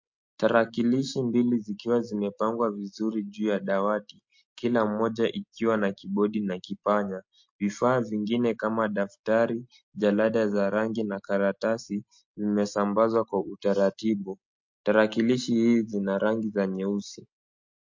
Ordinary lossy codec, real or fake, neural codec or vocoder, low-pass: MP3, 48 kbps; real; none; 7.2 kHz